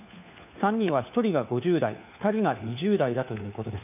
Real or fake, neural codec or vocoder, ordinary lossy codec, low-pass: fake; codec, 16 kHz, 4 kbps, FunCodec, trained on LibriTTS, 50 frames a second; none; 3.6 kHz